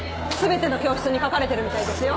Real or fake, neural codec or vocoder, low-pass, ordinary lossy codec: real; none; none; none